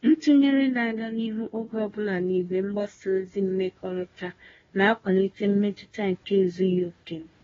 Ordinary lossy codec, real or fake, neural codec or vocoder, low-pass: AAC, 24 kbps; fake; codec, 16 kHz, 1 kbps, FunCodec, trained on Chinese and English, 50 frames a second; 7.2 kHz